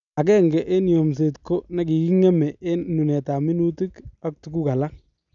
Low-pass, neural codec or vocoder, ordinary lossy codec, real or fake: 7.2 kHz; none; none; real